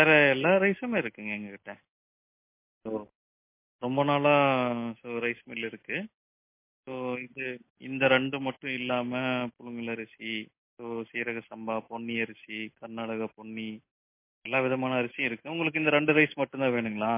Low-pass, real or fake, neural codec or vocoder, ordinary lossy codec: 3.6 kHz; real; none; MP3, 24 kbps